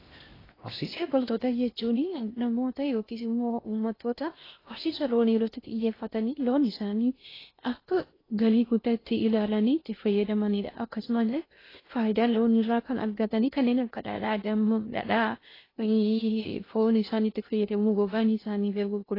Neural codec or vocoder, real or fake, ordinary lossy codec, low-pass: codec, 16 kHz in and 24 kHz out, 0.6 kbps, FocalCodec, streaming, 2048 codes; fake; AAC, 24 kbps; 5.4 kHz